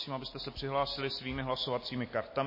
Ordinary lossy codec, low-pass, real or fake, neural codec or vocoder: MP3, 24 kbps; 5.4 kHz; real; none